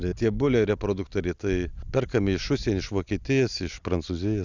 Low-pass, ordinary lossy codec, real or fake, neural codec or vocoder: 7.2 kHz; Opus, 64 kbps; real; none